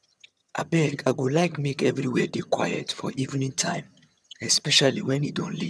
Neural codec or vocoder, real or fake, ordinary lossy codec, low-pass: vocoder, 22.05 kHz, 80 mel bands, HiFi-GAN; fake; none; none